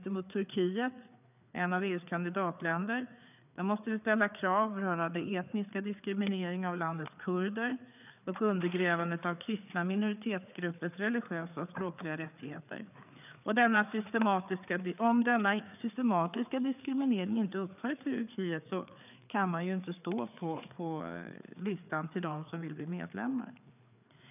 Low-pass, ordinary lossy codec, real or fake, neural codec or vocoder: 3.6 kHz; none; fake; codec, 16 kHz, 4 kbps, FreqCodec, larger model